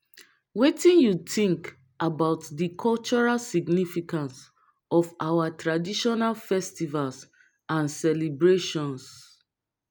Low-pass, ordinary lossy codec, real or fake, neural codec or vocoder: 19.8 kHz; none; real; none